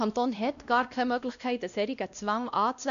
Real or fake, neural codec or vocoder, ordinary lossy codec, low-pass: fake; codec, 16 kHz, 1 kbps, X-Codec, WavLM features, trained on Multilingual LibriSpeech; none; 7.2 kHz